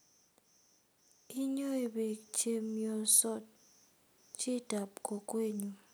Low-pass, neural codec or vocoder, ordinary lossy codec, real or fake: none; none; none; real